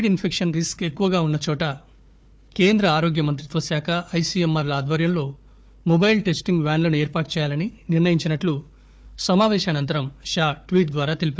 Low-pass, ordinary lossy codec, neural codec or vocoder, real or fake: none; none; codec, 16 kHz, 4 kbps, FunCodec, trained on Chinese and English, 50 frames a second; fake